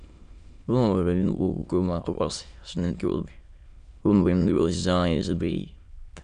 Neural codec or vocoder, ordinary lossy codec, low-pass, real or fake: autoencoder, 22.05 kHz, a latent of 192 numbers a frame, VITS, trained on many speakers; none; 9.9 kHz; fake